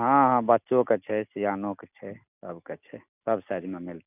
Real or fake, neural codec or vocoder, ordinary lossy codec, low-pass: real; none; none; 3.6 kHz